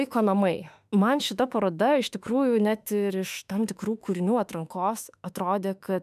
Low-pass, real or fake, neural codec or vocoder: 14.4 kHz; fake; autoencoder, 48 kHz, 32 numbers a frame, DAC-VAE, trained on Japanese speech